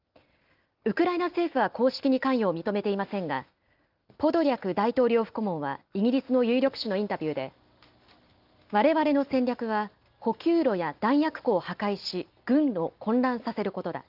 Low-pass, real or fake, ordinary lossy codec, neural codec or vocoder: 5.4 kHz; real; Opus, 24 kbps; none